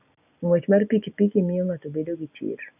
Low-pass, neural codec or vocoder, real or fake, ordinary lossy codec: 3.6 kHz; none; real; none